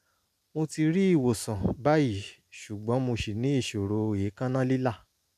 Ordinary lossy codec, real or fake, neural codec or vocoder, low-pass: none; real; none; 14.4 kHz